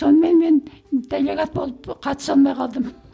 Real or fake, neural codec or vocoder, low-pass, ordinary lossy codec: real; none; none; none